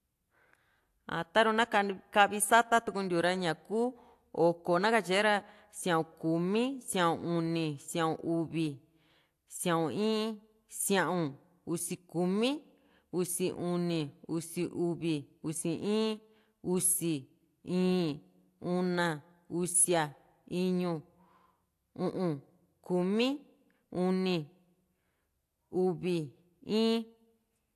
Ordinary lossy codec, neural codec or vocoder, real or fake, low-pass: AAC, 64 kbps; none; real; 14.4 kHz